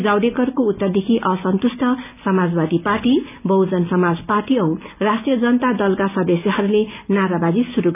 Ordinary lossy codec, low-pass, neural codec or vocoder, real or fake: none; 3.6 kHz; none; real